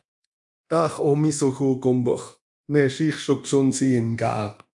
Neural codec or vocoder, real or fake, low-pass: codec, 24 kHz, 0.9 kbps, DualCodec; fake; 10.8 kHz